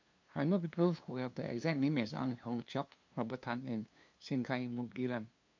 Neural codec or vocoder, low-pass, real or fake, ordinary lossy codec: codec, 16 kHz, 2 kbps, FunCodec, trained on LibriTTS, 25 frames a second; 7.2 kHz; fake; MP3, 48 kbps